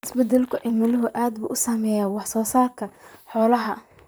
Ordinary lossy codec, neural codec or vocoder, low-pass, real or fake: none; vocoder, 44.1 kHz, 128 mel bands, Pupu-Vocoder; none; fake